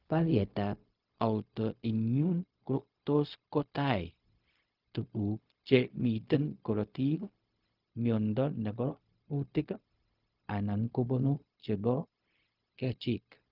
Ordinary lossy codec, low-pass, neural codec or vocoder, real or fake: Opus, 24 kbps; 5.4 kHz; codec, 16 kHz, 0.4 kbps, LongCat-Audio-Codec; fake